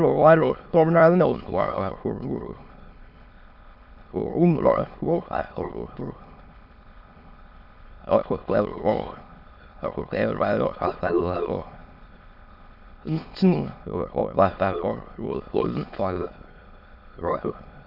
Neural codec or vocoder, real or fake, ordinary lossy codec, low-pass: autoencoder, 22.05 kHz, a latent of 192 numbers a frame, VITS, trained on many speakers; fake; Opus, 64 kbps; 5.4 kHz